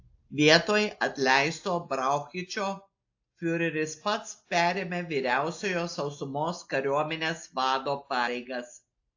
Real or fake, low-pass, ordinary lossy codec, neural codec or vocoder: real; 7.2 kHz; AAC, 48 kbps; none